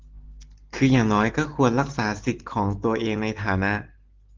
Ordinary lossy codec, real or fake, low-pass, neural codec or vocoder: Opus, 16 kbps; real; 7.2 kHz; none